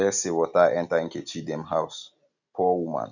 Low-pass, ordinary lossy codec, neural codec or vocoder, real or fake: 7.2 kHz; none; none; real